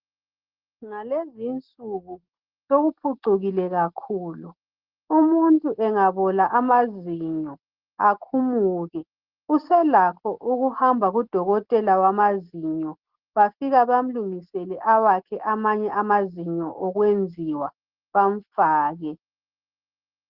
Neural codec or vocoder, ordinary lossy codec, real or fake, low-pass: none; Opus, 16 kbps; real; 5.4 kHz